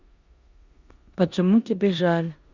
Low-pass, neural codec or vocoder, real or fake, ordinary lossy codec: 7.2 kHz; codec, 16 kHz in and 24 kHz out, 0.9 kbps, LongCat-Audio-Codec, four codebook decoder; fake; Opus, 64 kbps